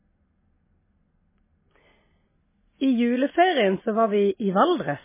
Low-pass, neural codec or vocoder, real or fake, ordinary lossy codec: 3.6 kHz; none; real; MP3, 16 kbps